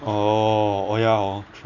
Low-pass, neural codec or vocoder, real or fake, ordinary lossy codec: 7.2 kHz; none; real; none